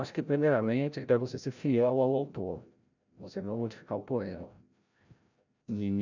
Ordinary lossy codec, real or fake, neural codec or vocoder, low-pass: none; fake; codec, 16 kHz, 0.5 kbps, FreqCodec, larger model; 7.2 kHz